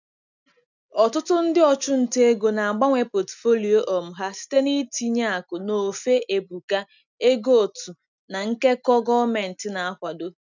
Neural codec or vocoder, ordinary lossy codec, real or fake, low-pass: none; none; real; 7.2 kHz